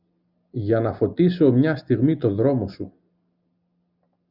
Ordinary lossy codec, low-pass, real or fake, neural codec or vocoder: Opus, 64 kbps; 5.4 kHz; real; none